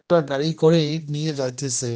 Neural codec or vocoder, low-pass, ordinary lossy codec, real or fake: codec, 16 kHz, 1 kbps, X-Codec, HuBERT features, trained on general audio; none; none; fake